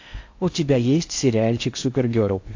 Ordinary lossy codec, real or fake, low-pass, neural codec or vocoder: AAC, 48 kbps; fake; 7.2 kHz; codec, 16 kHz in and 24 kHz out, 0.6 kbps, FocalCodec, streaming, 4096 codes